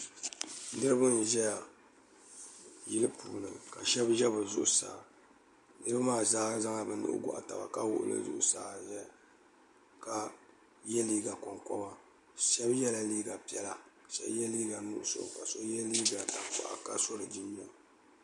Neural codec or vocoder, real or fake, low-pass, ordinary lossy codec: none; real; 10.8 kHz; MP3, 96 kbps